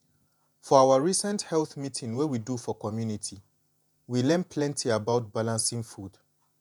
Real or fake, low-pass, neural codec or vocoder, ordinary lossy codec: fake; 19.8 kHz; vocoder, 48 kHz, 128 mel bands, Vocos; none